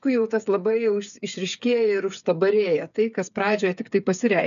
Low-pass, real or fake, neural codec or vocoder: 7.2 kHz; fake; codec, 16 kHz, 8 kbps, FreqCodec, smaller model